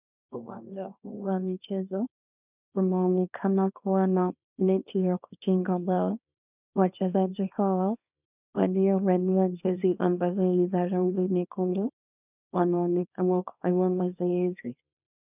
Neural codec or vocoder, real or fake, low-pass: codec, 24 kHz, 0.9 kbps, WavTokenizer, small release; fake; 3.6 kHz